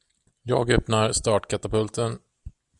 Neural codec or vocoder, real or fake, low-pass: none; real; 10.8 kHz